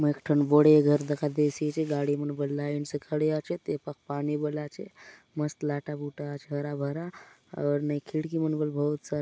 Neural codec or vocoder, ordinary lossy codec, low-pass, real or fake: none; none; none; real